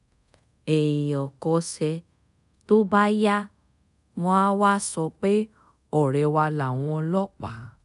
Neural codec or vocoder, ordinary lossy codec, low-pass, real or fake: codec, 24 kHz, 0.5 kbps, DualCodec; none; 10.8 kHz; fake